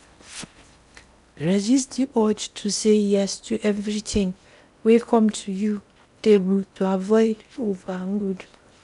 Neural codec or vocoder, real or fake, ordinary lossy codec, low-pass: codec, 16 kHz in and 24 kHz out, 0.8 kbps, FocalCodec, streaming, 65536 codes; fake; none; 10.8 kHz